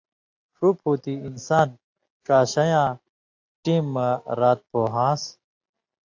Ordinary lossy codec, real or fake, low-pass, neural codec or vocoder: AAC, 48 kbps; real; 7.2 kHz; none